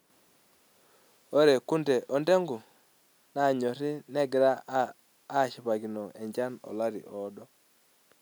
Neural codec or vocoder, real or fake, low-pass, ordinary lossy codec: none; real; none; none